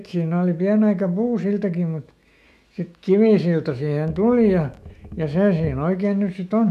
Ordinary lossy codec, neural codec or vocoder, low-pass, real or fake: none; autoencoder, 48 kHz, 128 numbers a frame, DAC-VAE, trained on Japanese speech; 14.4 kHz; fake